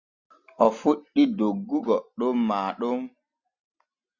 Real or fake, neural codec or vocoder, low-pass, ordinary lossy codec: real; none; 7.2 kHz; Opus, 64 kbps